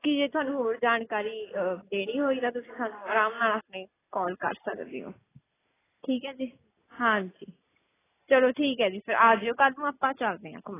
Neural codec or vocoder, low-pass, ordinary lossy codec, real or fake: none; 3.6 kHz; AAC, 16 kbps; real